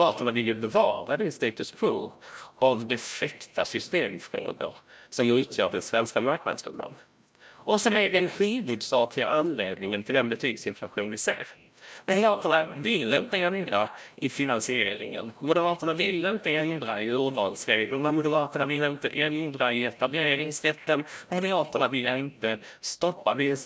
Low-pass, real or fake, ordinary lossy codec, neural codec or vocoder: none; fake; none; codec, 16 kHz, 0.5 kbps, FreqCodec, larger model